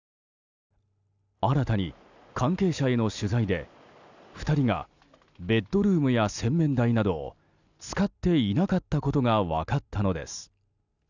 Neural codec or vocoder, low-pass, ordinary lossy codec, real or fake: none; 7.2 kHz; none; real